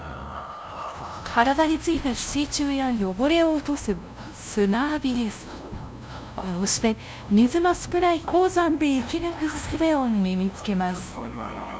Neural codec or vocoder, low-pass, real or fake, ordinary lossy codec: codec, 16 kHz, 0.5 kbps, FunCodec, trained on LibriTTS, 25 frames a second; none; fake; none